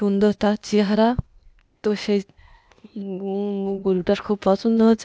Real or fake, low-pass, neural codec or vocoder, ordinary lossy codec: fake; none; codec, 16 kHz, 0.8 kbps, ZipCodec; none